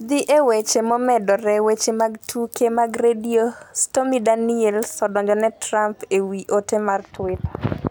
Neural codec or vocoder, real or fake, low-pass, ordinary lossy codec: none; real; none; none